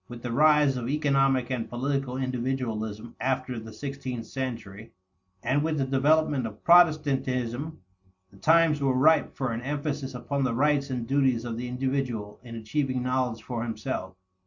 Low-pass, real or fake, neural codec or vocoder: 7.2 kHz; real; none